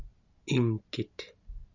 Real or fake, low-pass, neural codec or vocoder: real; 7.2 kHz; none